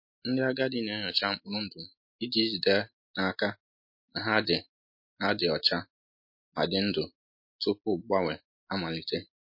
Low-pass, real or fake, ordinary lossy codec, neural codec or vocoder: 5.4 kHz; real; MP3, 32 kbps; none